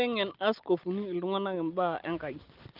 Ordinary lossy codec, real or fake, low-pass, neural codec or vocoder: Opus, 32 kbps; real; 5.4 kHz; none